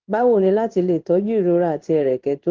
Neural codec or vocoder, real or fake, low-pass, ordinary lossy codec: codec, 16 kHz in and 24 kHz out, 1 kbps, XY-Tokenizer; fake; 7.2 kHz; Opus, 16 kbps